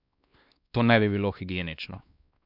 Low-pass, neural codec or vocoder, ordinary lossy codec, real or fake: 5.4 kHz; codec, 16 kHz, 2 kbps, X-Codec, WavLM features, trained on Multilingual LibriSpeech; none; fake